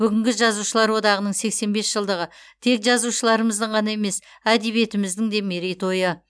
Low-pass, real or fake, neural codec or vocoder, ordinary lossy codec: none; real; none; none